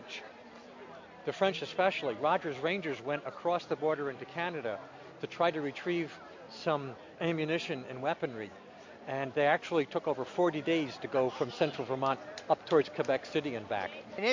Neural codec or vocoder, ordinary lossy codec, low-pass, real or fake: none; MP3, 48 kbps; 7.2 kHz; real